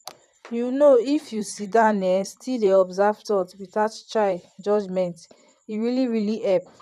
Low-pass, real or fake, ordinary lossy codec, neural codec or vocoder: 14.4 kHz; fake; none; vocoder, 44.1 kHz, 128 mel bands, Pupu-Vocoder